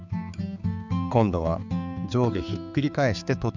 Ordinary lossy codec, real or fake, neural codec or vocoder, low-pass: none; fake; codec, 16 kHz, 4 kbps, X-Codec, HuBERT features, trained on balanced general audio; 7.2 kHz